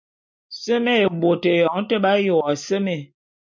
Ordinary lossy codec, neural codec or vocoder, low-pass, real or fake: MP3, 64 kbps; none; 7.2 kHz; real